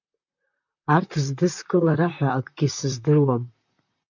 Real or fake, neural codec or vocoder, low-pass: fake; vocoder, 44.1 kHz, 128 mel bands, Pupu-Vocoder; 7.2 kHz